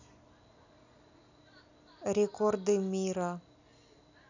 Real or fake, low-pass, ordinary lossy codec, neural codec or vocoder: real; 7.2 kHz; none; none